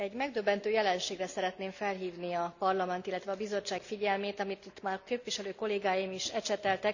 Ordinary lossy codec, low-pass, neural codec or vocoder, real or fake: none; 7.2 kHz; none; real